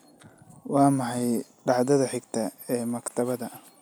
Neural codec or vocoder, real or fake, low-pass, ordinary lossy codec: none; real; none; none